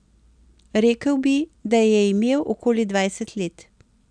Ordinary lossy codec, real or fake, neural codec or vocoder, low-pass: none; real; none; 9.9 kHz